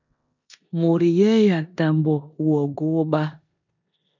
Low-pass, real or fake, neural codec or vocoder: 7.2 kHz; fake; codec, 16 kHz in and 24 kHz out, 0.9 kbps, LongCat-Audio-Codec, four codebook decoder